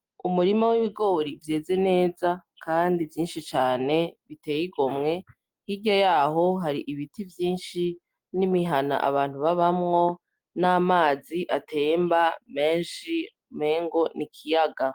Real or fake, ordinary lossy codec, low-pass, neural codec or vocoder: real; Opus, 24 kbps; 19.8 kHz; none